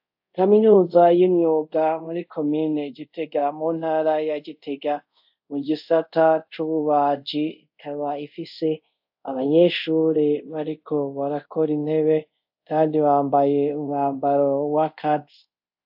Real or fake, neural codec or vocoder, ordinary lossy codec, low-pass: fake; codec, 24 kHz, 0.5 kbps, DualCodec; AAC, 48 kbps; 5.4 kHz